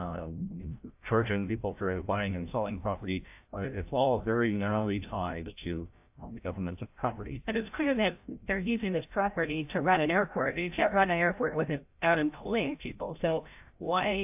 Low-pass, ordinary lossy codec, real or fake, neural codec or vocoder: 3.6 kHz; AAC, 32 kbps; fake; codec, 16 kHz, 0.5 kbps, FreqCodec, larger model